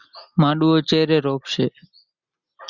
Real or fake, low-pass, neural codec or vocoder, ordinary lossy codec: real; 7.2 kHz; none; Opus, 64 kbps